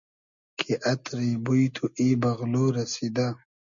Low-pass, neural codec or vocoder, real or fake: 7.2 kHz; none; real